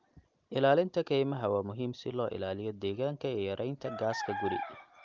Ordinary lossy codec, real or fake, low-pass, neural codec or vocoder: Opus, 32 kbps; real; 7.2 kHz; none